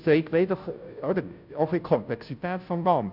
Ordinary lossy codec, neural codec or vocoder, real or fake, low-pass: none; codec, 16 kHz, 0.5 kbps, FunCodec, trained on Chinese and English, 25 frames a second; fake; 5.4 kHz